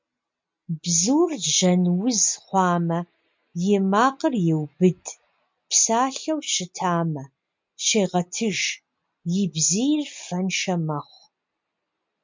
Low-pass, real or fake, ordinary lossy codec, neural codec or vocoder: 7.2 kHz; real; MP3, 64 kbps; none